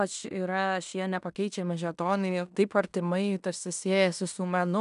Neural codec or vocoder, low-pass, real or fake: codec, 16 kHz in and 24 kHz out, 0.9 kbps, LongCat-Audio-Codec, fine tuned four codebook decoder; 10.8 kHz; fake